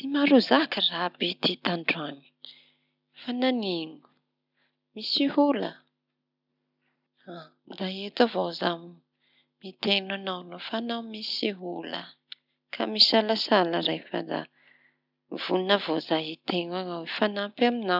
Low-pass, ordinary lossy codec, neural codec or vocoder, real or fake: 5.4 kHz; none; none; real